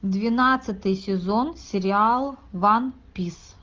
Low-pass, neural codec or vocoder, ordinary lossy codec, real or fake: 7.2 kHz; none; Opus, 32 kbps; real